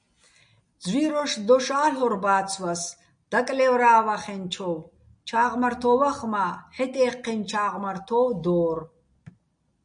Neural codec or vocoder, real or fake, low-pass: none; real; 9.9 kHz